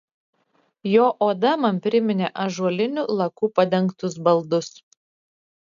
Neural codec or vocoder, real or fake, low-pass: none; real; 7.2 kHz